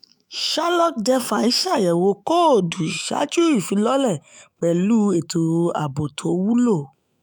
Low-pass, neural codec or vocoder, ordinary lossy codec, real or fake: none; autoencoder, 48 kHz, 128 numbers a frame, DAC-VAE, trained on Japanese speech; none; fake